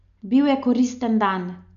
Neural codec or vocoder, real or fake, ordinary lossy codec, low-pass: none; real; none; 7.2 kHz